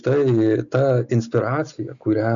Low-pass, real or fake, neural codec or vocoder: 7.2 kHz; real; none